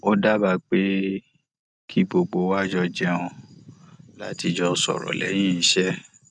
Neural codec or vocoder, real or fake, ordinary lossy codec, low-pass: none; real; none; none